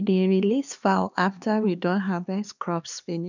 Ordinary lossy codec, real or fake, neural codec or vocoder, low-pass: none; fake; codec, 16 kHz, 2 kbps, X-Codec, HuBERT features, trained on LibriSpeech; 7.2 kHz